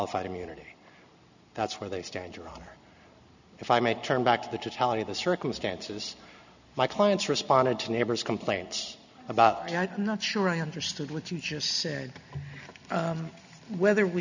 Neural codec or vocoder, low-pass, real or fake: none; 7.2 kHz; real